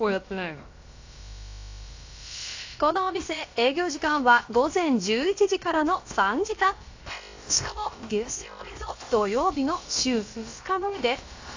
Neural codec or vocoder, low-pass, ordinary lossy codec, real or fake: codec, 16 kHz, about 1 kbps, DyCAST, with the encoder's durations; 7.2 kHz; AAC, 48 kbps; fake